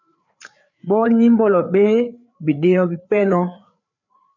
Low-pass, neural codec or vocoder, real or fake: 7.2 kHz; codec, 16 kHz, 4 kbps, FreqCodec, larger model; fake